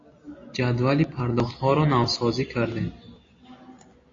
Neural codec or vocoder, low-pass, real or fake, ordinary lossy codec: none; 7.2 kHz; real; AAC, 32 kbps